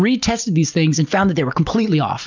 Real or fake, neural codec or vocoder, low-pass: real; none; 7.2 kHz